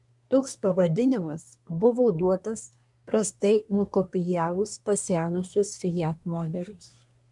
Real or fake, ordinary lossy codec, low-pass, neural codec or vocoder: fake; MP3, 96 kbps; 10.8 kHz; codec, 24 kHz, 1 kbps, SNAC